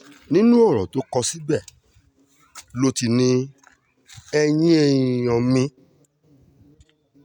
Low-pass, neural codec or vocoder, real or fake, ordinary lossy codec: 19.8 kHz; none; real; none